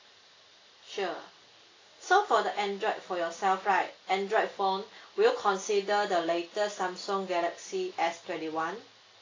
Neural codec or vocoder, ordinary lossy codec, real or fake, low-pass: none; AAC, 32 kbps; real; 7.2 kHz